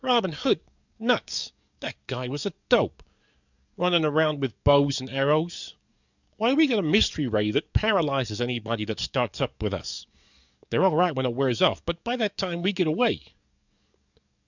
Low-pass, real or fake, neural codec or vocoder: 7.2 kHz; fake; codec, 44.1 kHz, 7.8 kbps, DAC